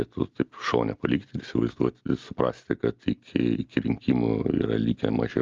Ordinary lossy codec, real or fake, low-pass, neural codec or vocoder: Opus, 32 kbps; real; 7.2 kHz; none